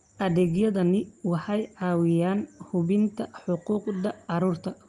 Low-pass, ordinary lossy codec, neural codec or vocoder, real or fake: 10.8 kHz; Opus, 32 kbps; none; real